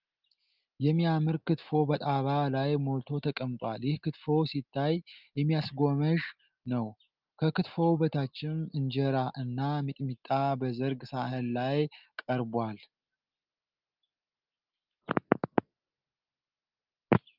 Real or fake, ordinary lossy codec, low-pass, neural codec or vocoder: real; Opus, 32 kbps; 5.4 kHz; none